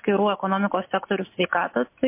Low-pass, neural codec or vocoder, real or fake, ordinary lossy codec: 3.6 kHz; none; real; MP3, 24 kbps